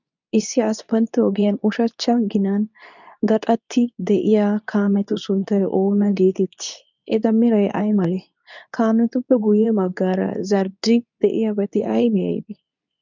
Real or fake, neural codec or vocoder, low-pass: fake; codec, 24 kHz, 0.9 kbps, WavTokenizer, medium speech release version 2; 7.2 kHz